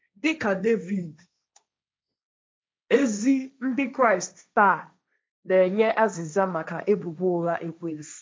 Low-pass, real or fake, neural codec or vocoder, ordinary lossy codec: none; fake; codec, 16 kHz, 1.1 kbps, Voila-Tokenizer; none